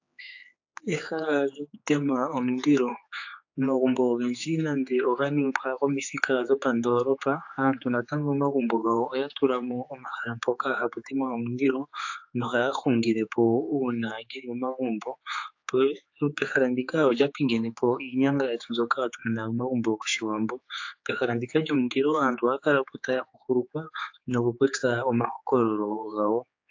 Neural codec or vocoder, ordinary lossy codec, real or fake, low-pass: codec, 16 kHz, 4 kbps, X-Codec, HuBERT features, trained on general audio; AAC, 48 kbps; fake; 7.2 kHz